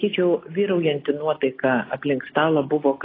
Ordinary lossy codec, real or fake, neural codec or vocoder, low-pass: AAC, 24 kbps; real; none; 5.4 kHz